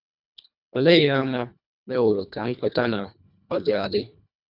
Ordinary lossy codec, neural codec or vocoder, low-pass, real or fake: none; codec, 24 kHz, 1.5 kbps, HILCodec; 5.4 kHz; fake